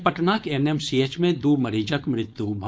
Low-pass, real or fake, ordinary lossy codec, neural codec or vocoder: none; fake; none; codec, 16 kHz, 4.8 kbps, FACodec